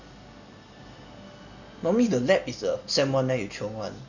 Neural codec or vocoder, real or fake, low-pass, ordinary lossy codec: none; real; 7.2 kHz; none